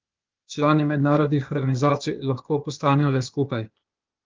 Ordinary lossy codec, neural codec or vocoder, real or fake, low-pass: Opus, 24 kbps; codec, 16 kHz, 0.8 kbps, ZipCodec; fake; 7.2 kHz